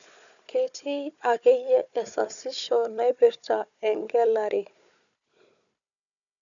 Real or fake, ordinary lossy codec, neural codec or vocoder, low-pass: fake; none; codec, 16 kHz, 4 kbps, FunCodec, trained on Chinese and English, 50 frames a second; 7.2 kHz